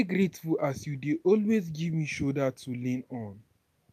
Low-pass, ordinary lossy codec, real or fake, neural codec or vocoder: 14.4 kHz; none; real; none